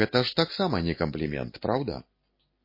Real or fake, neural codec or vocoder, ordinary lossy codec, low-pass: real; none; MP3, 24 kbps; 5.4 kHz